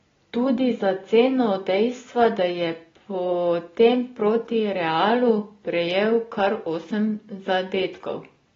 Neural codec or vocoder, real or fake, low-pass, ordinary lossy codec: none; real; 7.2 kHz; AAC, 24 kbps